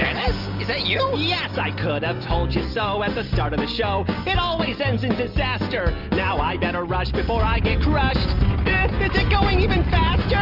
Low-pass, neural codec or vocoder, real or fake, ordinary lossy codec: 5.4 kHz; none; real; Opus, 24 kbps